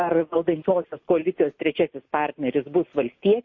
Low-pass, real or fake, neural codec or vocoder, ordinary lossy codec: 7.2 kHz; real; none; MP3, 32 kbps